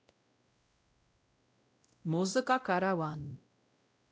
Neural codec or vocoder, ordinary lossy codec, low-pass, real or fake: codec, 16 kHz, 0.5 kbps, X-Codec, WavLM features, trained on Multilingual LibriSpeech; none; none; fake